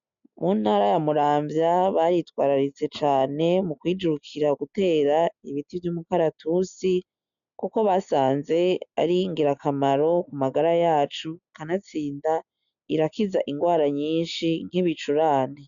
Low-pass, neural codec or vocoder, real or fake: 7.2 kHz; none; real